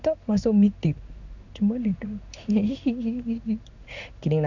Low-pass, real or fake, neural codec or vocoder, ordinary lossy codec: 7.2 kHz; fake; codec, 16 kHz in and 24 kHz out, 1 kbps, XY-Tokenizer; none